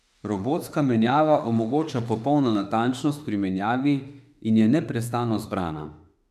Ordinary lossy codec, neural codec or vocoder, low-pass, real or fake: none; autoencoder, 48 kHz, 32 numbers a frame, DAC-VAE, trained on Japanese speech; 14.4 kHz; fake